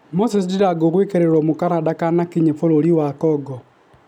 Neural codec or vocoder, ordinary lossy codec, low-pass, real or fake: none; none; 19.8 kHz; real